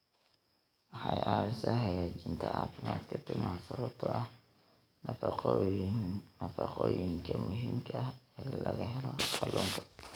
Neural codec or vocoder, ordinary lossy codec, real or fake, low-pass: codec, 44.1 kHz, 7.8 kbps, DAC; none; fake; none